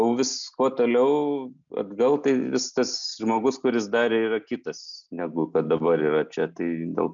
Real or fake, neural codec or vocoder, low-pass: real; none; 7.2 kHz